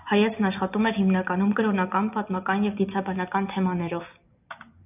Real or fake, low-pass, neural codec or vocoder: real; 3.6 kHz; none